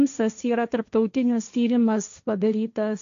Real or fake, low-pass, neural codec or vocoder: fake; 7.2 kHz; codec, 16 kHz, 1.1 kbps, Voila-Tokenizer